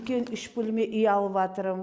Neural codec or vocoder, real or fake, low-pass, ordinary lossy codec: none; real; none; none